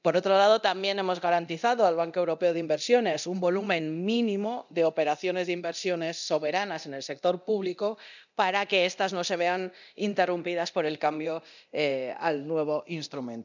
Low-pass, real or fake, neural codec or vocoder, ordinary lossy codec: 7.2 kHz; fake; codec, 24 kHz, 0.9 kbps, DualCodec; none